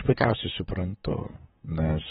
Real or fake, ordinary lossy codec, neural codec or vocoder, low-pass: fake; AAC, 16 kbps; vocoder, 44.1 kHz, 128 mel bands, Pupu-Vocoder; 19.8 kHz